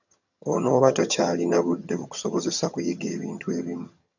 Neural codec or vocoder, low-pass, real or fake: vocoder, 22.05 kHz, 80 mel bands, HiFi-GAN; 7.2 kHz; fake